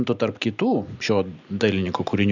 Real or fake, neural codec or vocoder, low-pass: real; none; 7.2 kHz